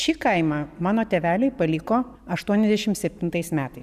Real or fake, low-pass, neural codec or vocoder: real; 14.4 kHz; none